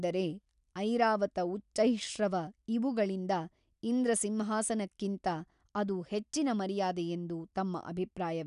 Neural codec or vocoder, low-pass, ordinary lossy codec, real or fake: none; 10.8 kHz; none; real